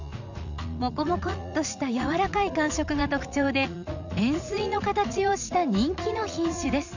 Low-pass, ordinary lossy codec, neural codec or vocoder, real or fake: 7.2 kHz; none; vocoder, 44.1 kHz, 80 mel bands, Vocos; fake